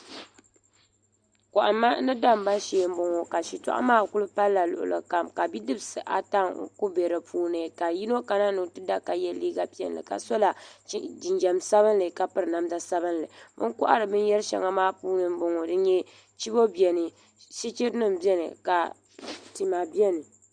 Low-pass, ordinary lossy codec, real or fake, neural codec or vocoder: 9.9 kHz; Opus, 64 kbps; real; none